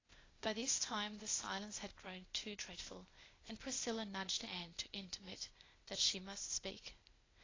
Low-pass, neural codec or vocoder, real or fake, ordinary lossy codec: 7.2 kHz; codec, 16 kHz, 0.8 kbps, ZipCodec; fake; AAC, 32 kbps